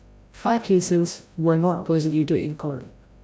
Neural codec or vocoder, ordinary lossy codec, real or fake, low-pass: codec, 16 kHz, 0.5 kbps, FreqCodec, larger model; none; fake; none